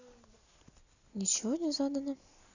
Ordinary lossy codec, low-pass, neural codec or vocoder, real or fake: none; 7.2 kHz; none; real